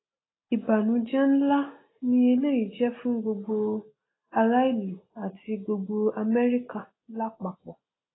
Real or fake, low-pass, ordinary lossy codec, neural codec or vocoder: real; 7.2 kHz; AAC, 16 kbps; none